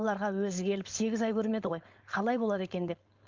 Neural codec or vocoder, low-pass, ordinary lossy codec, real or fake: codec, 16 kHz, 4.8 kbps, FACodec; 7.2 kHz; Opus, 24 kbps; fake